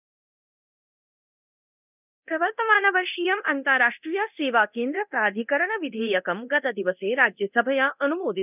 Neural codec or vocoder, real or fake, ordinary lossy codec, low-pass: codec, 24 kHz, 0.9 kbps, DualCodec; fake; none; 3.6 kHz